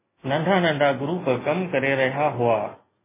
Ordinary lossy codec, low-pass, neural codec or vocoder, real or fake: MP3, 16 kbps; 3.6 kHz; none; real